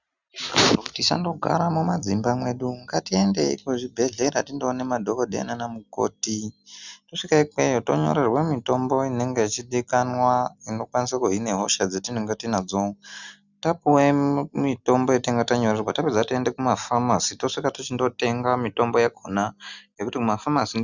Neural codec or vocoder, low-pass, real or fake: none; 7.2 kHz; real